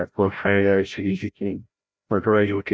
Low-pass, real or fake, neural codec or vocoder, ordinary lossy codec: none; fake; codec, 16 kHz, 0.5 kbps, FreqCodec, larger model; none